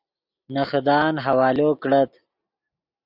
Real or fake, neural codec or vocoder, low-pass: real; none; 5.4 kHz